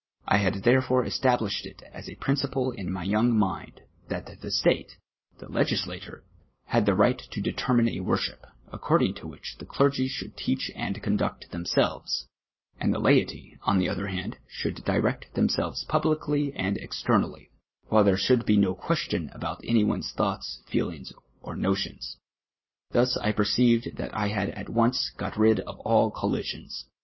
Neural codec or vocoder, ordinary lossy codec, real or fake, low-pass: none; MP3, 24 kbps; real; 7.2 kHz